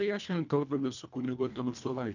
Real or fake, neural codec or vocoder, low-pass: fake; codec, 24 kHz, 1.5 kbps, HILCodec; 7.2 kHz